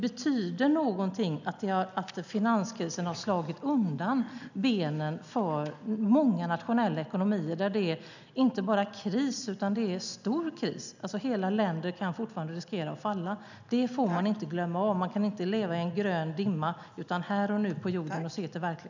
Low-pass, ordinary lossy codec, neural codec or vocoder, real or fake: 7.2 kHz; none; none; real